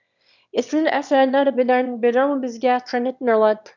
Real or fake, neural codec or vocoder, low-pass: fake; autoencoder, 22.05 kHz, a latent of 192 numbers a frame, VITS, trained on one speaker; 7.2 kHz